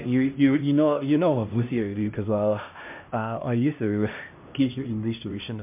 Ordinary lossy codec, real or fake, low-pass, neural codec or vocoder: MP3, 32 kbps; fake; 3.6 kHz; codec, 16 kHz, 1 kbps, X-Codec, HuBERT features, trained on LibriSpeech